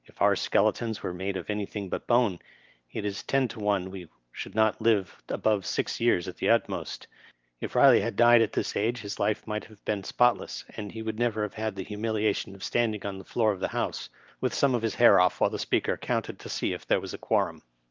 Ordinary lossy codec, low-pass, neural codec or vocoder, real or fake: Opus, 32 kbps; 7.2 kHz; none; real